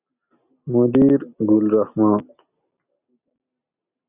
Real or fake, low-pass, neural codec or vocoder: real; 3.6 kHz; none